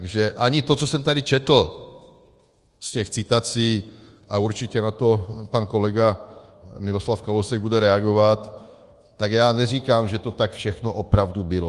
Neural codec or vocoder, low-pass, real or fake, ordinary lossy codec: codec, 24 kHz, 1.2 kbps, DualCodec; 10.8 kHz; fake; Opus, 24 kbps